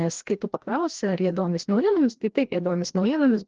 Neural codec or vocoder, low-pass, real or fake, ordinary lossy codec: codec, 16 kHz, 1 kbps, FreqCodec, larger model; 7.2 kHz; fake; Opus, 16 kbps